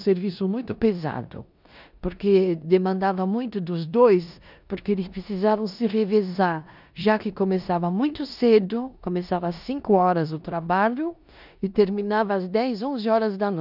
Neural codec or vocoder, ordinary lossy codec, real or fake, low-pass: codec, 16 kHz in and 24 kHz out, 0.9 kbps, LongCat-Audio-Codec, four codebook decoder; none; fake; 5.4 kHz